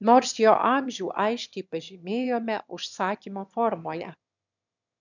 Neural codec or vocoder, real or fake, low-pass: autoencoder, 22.05 kHz, a latent of 192 numbers a frame, VITS, trained on one speaker; fake; 7.2 kHz